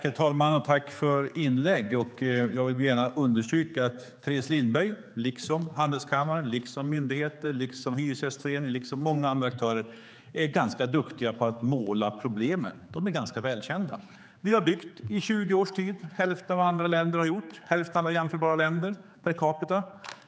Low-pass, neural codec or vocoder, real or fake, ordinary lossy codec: none; codec, 16 kHz, 4 kbps, X-Codec, HuBERT features, trained on general audio; fake; none